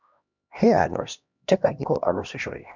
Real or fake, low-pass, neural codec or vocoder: fake; 7.2 kHz; codec, 16 kHz, 1 kbps, X-Codec, HuBERT features, trained on LibriSpeech